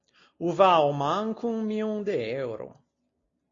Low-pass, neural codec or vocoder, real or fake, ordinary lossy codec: 7.2 kHz; none; real; AAC, 32 kbps